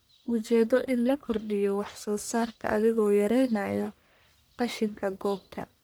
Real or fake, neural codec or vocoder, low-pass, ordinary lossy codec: fake; codec, 44.1 kHz, 1.7 kbps, Pupu-Codec; none; none